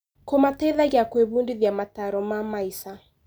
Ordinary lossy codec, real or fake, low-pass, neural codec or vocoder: none; real; none; none